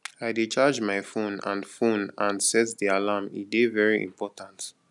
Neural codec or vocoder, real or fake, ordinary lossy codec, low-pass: none; real; none; 10.8 kHz